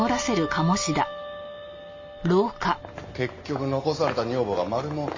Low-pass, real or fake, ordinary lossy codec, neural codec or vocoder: 7.2 kHz; real; MP3, 32 kbps; none